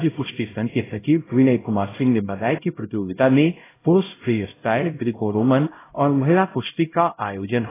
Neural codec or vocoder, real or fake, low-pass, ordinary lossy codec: codec, 16 kHz, 0.5 kbps, X-Codec, HuBERT features, trained on LibriSpeech; fake; 3.6 kHz; AAC, 16 kbps